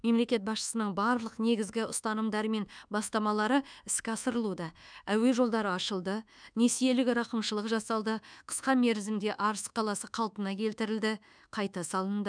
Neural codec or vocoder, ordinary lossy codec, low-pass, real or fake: codec, 24 kHz, 1.2 kbps, DualCodec; none; 9.9 kHz; fake